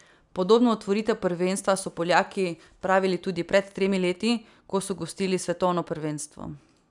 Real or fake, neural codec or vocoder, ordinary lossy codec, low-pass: real; none; none; 10.8 kHz